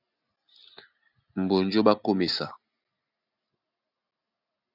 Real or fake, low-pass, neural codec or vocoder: real; 5.4 kHz; none